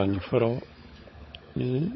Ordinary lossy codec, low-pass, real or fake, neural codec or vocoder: MP3, 24 kbps; 7.2 kHz; fake; codec, 16 kHz, 16 kbps, FunCodec, trained on LibriTTS, 50 frames a second